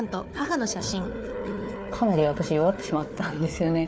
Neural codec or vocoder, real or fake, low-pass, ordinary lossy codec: codec, 16 kHz, 4 kbps, FunCodec, trained on Chinese and English, 50 frames a second; fake; none; none